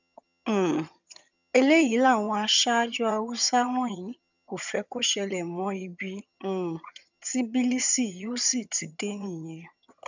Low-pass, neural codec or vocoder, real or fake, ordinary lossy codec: 7.2 kHz; vocoder, 22.05 kHz, 80 mel bands, HiFi-GAN; fake; none